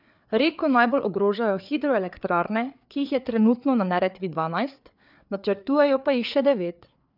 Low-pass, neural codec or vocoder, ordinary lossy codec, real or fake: 5.4 kHz; codec, 16 kHz, 4 kbps, FreqCodec, larger model; none; fake